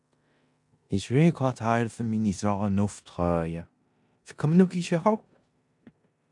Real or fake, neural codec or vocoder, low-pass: fake; codec, 16 kHz in and 24 kHz out, 0.9 kbps, LongCat-Audio-Codec, four codebook decoder; 10.8 kHz